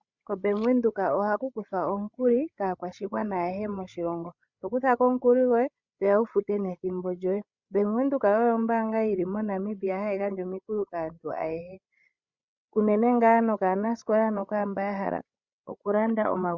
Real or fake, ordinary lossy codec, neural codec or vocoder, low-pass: fake; Opus, 64 kbps; codec, 16 kHz, 16 kbps, FreqCodec, larger model; 7.2 kHz